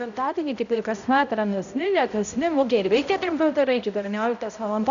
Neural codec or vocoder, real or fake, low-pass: codec, 16 kHz, 0.5 kbps, X-Codec, HuBERT features, trained on balanced general audio; fake; 7.2 kHz